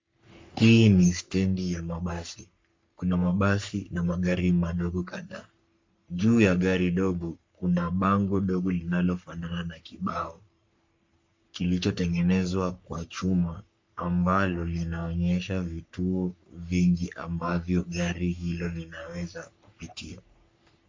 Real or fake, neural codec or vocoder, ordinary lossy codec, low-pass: fake; codec, 44.1 kHz, 3.4 kbps, Pupu-Codec; MP3, 64 kbps; 7.2 kHz